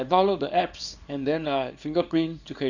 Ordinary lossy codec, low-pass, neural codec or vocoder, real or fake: Opus, 64 kbps; 7.2 kHz; codec, 24 kHz, 0.9 kbps, WavTokenizer, small release; fake